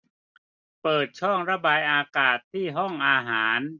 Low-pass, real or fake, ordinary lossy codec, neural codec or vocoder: 7.2 kHz; real; none; none